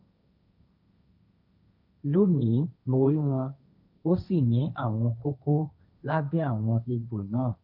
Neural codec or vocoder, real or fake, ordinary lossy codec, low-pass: codec, 16 kHz, 1.1 kbps, Voila-Tokenizer; fake; none; 5.4 kHz